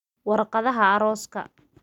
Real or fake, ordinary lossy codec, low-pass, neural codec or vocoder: real; none; 19.8 kHz; none